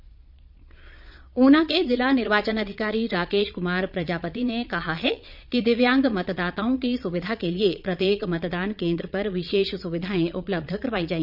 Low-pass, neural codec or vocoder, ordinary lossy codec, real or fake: 5.4 kHz; vocoder, 22.05 kHz, 80 mel bands, Vocos; none; fake